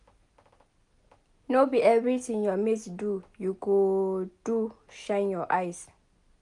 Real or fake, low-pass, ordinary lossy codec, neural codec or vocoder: real; 10.8 kHz; none; none